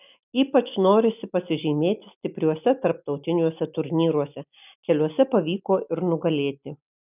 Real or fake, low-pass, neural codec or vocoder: real; 3.6 kHz; none